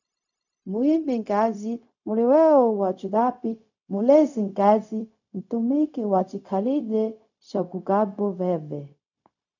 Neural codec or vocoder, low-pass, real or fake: codec, 16 kHz, 0.4 kbps, LongCat-Audio-Codec; 7.2 kHz; fake